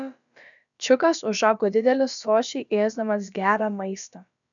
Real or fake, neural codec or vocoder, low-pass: fake; codec, 16 kHz, about 1 kbps, DyCAST, with the encoder's durations; 7.2 kHz